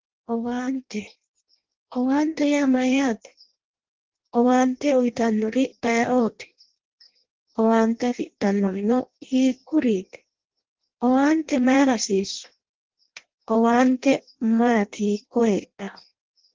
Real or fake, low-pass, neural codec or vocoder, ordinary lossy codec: fake; 7.2 kHz; codec, 16 kHz in and 24 kHz out, 0.6 kbps, FireRedTTS-2 codec; Opus, 16 kbps